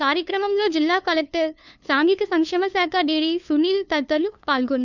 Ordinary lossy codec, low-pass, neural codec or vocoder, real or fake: none; 7.2 kHz; codec, 16 kHz, 2 kbps, FunCodec, trained on LibriTTS, 25 frames a second; fake